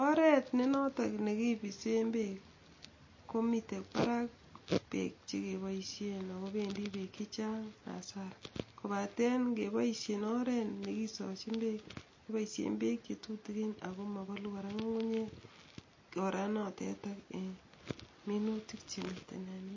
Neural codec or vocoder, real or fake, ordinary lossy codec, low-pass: none; real; MP3, 32 kbps; 7.2 kHz